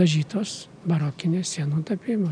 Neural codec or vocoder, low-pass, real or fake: none; 9.9 kHz; real